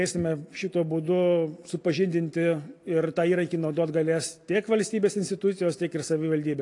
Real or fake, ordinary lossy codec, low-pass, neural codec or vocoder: real; AAC, 48 kbps; 10.8 kHz; none